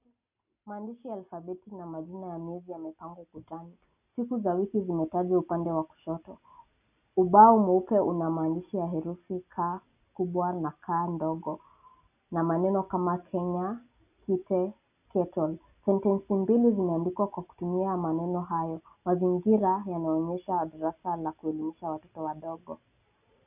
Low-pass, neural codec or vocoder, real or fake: 3.6 kHz; none; real